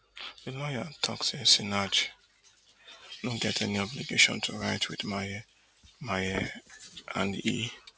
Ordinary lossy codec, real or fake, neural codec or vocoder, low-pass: none; real; none; none